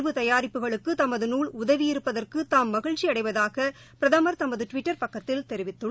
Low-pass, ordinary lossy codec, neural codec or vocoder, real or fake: none; none; none; real